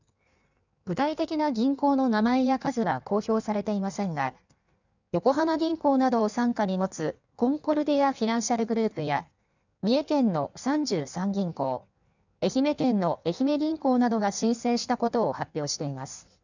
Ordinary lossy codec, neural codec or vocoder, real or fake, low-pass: none; codec, 16 kHz in and 24 kHz out, 1.1 kbps, FireRedTTS-2 codec; fake; 7.2 kHz